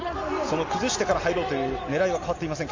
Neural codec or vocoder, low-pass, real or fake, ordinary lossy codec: none; 7.2 kHz; real; AAC, 48 kbps